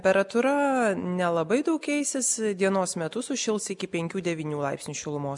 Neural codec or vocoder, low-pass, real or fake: none; 10.8 kHz; real